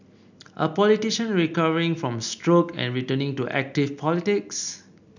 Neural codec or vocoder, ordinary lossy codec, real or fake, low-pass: none; none; real; 7.2 kHz